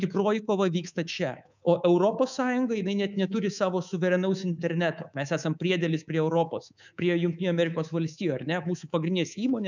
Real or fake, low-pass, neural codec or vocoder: fake; 7.2 kHz; codec, 24 kHz, 3.1 kbps, DualCodec